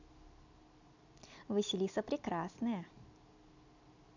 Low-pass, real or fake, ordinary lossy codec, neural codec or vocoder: 7.2 kHz; real; none; none